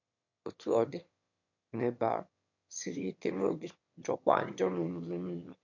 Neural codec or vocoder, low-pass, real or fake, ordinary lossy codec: autoencoder, 22.05 kHz, a latent of 192 numbers a frame, VITS, trained on one speaker; 7.2 kHz; fake; MP3, 48 kbps